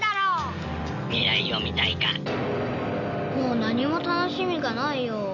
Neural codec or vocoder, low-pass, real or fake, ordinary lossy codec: none; 7.2 kHz; real; none